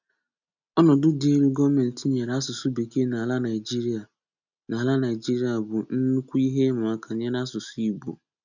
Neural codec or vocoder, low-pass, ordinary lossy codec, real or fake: none; 7.2 kHz; none; real